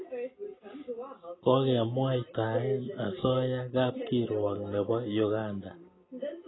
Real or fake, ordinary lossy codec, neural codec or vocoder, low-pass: real; AAC, 16 kbps; none; 7.2 kHz